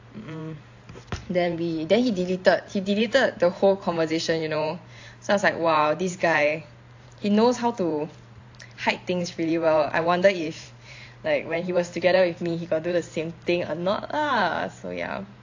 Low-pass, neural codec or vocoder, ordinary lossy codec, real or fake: 7.2 kHz; vocoder, 44.1 kHz, 128 mel bands every 512 samples, BigVGAN v2; AAC, 48 kbps; fake